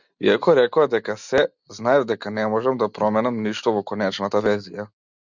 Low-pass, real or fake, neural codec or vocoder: 7.2 kHz; real; none